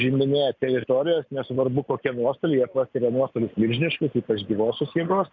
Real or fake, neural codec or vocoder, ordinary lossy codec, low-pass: real; none; MP3, 64 kbps; 7.2 kHz